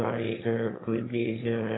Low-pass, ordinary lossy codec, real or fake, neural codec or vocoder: 7.2 kHz; AAC, 16 kbps; fake; autoencoder, 22.05 kHz, a latent of 192 numbers a frame, VITS, trained on one speaker